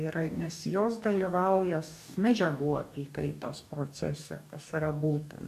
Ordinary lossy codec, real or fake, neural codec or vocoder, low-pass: AAC, 96 kbps; fake; codec, 44.1 kHz, 2.6 kbps, DAC; 14.4 kHz